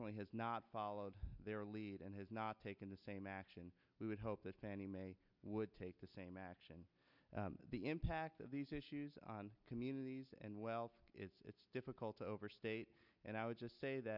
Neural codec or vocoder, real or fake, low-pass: none; real; 5.4 kHz